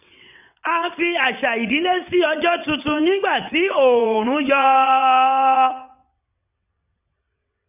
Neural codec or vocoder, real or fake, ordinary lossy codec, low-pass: vocoder, 22.05 kHz, 80 mel bands, WaveNeXt; fake; none; 3.6 kHz